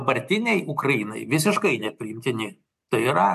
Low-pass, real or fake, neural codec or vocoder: 14.4 kHz; real; none